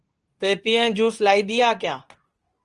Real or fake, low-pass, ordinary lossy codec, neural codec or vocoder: fake; 10.8 kHz; Opus, 24 kbps; codec, 24 kHz, 0.9 kbps, WavTokenizer, medium speech release version 2